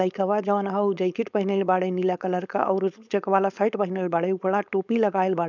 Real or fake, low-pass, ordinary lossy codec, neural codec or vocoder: fake; 7.2 kHz; none; codec, 16 kHz, 4.8 kbps, FACodec